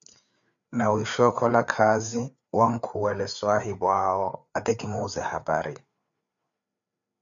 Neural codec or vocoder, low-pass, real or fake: codec, 16 kHz, 4 kbps, FreqCodec, larger model; 7.2 kHz; fake